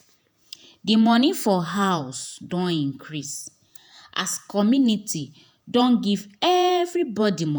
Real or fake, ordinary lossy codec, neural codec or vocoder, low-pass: fake; none; vocoder, 48 kHz, 128 mel bands, Vocos; none